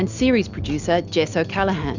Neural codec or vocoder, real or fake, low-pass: none; real; 7.2 kHz